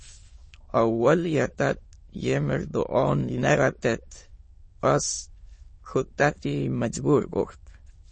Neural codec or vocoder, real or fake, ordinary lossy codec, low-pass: autoencoder, 22.05 kHz, a latent of 192 numbers a frame, VITS, trained on many speakers; fake; MP3, 32 kbps; 9.9 kHz